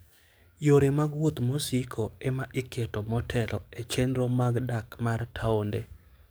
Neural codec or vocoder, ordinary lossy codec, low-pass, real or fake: codec, 44.1 kHz, 7.8 kbps, DAC; none; none; fake